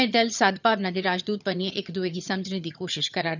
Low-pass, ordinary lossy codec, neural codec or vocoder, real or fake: 7.2 kHz; none; vocoder, 22.05 kHz, 80 mel bands, HiFi-GAN; fake